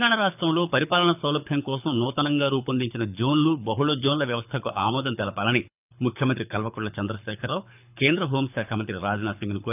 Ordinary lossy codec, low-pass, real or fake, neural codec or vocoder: none; 3.6 kHz; fake; codec, 24 kHz, 6 kbps, HILCodec